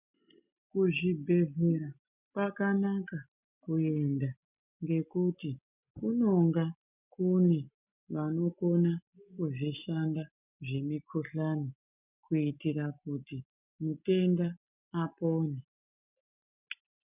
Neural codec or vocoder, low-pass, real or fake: none; 3.6 kHz; real